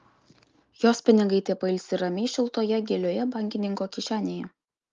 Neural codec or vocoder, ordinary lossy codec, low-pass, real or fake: none; Opus, 32 kbps; 7.2 kHz; real